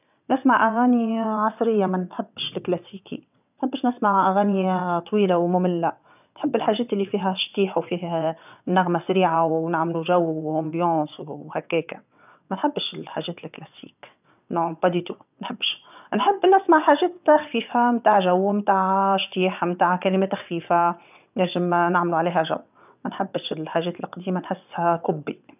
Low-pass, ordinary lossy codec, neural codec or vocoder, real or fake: 3.6 kHz; none; vocoder, 22.05 kHz, 80 mel bands, WaveNeXt; fake